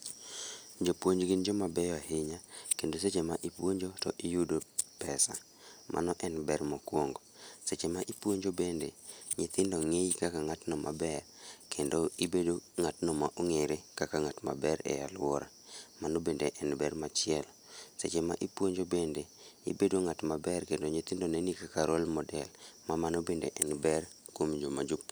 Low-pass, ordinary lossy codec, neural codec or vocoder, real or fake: none; none; none; real